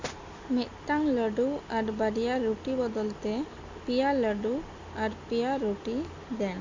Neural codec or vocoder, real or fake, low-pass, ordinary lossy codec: none; real; 7.2 kHz; MP3, 48 kbps